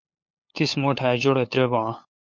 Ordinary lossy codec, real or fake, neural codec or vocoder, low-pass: MP3, 48 kbps; fake; codec, 16 kHz, 8 kbps, FunCodec, trained on LibriTTS, 25 frames a second; 7.2 kHz